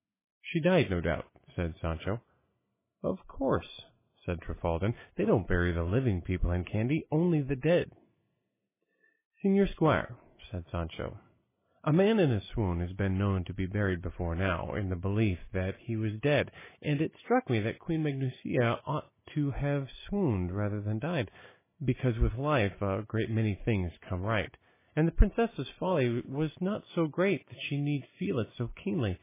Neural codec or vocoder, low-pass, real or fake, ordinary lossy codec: none; 3.6 kHz; real; MP3, 16 kbps